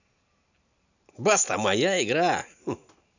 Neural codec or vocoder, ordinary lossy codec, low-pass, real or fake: none; none; 7.2 kHz; real